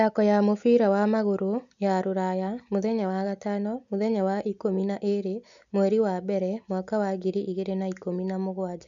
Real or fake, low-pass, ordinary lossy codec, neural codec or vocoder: real; 7.2 kHz; none; none